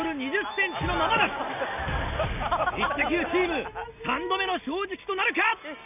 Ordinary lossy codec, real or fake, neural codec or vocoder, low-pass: none; real; none; 3.6 kHz